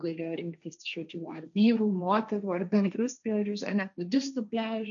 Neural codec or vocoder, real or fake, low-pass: codec, 16 kHz, 1.1 kbps, Voila-Tokenizer; fake; 7.2 kHz